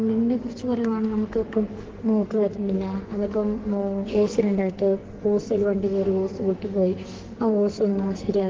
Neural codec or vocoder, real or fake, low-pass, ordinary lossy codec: codec, 44.1 kHz, 2.6 kbps, SNAC; fake; 7.2 kHz; Opus, 16 kbps